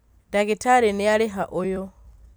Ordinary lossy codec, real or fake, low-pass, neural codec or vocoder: none; fake; none; vocoder, 44.1 kHz, 128 mel bands every 256 samples, BigVGAN v2